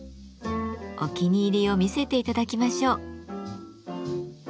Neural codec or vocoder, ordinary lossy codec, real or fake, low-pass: none; none; real; none